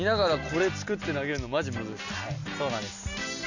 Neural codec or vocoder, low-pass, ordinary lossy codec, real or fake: none; 7.2 kHz; none; real